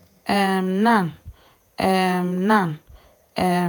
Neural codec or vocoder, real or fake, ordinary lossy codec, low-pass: vocoder, 48 kHz, 128 mel bands, Vocos; fake; none; none